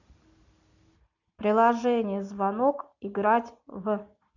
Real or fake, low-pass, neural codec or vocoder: real; 7.2 kHz; none